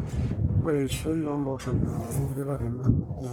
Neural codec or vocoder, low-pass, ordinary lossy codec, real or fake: codec, 44.1 kHz, 1.7 kbps, Pupu-Codec; none; none; fake